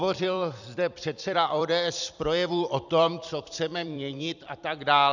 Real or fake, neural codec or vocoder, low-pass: fake; vocoder, 44.1 kHz, 128 mel bands every 256 samples, BigVGAN v2; 7.2 kHz